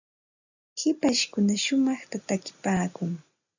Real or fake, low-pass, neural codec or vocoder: real; 7.2 kHz; none